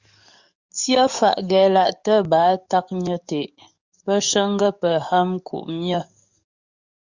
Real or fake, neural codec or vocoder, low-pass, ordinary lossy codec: fake; codec, 44.1 kHz, 7.8 kbps, DAC; 7.2 kHz; Opus, 64 kbps